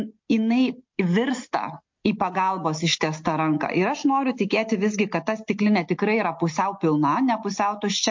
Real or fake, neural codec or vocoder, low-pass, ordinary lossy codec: real; none; 7.2 kHz; MP3, 48 kbps